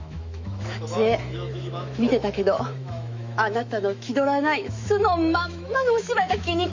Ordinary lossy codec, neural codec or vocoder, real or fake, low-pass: MP3, 48 kbps; autoencoder, 48 kHz, 128 numbers a frame, DAC-VAE, trained on Japanese speech; fake; 7.2 kHz